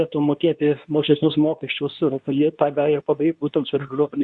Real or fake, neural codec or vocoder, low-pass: fake; codec, 24 kHz, 0.9 kbps, WavTokenizer, medium speech release version 2; 10.8 kHz